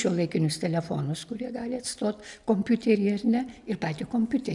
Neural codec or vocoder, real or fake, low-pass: none; real; 10.8 kHz